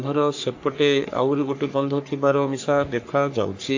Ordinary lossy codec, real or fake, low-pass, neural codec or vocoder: AAC, 48 kbps; fake; 7.2 kHz; codec, 44.1 kHz, 3.4 kbps, Pupu-Codec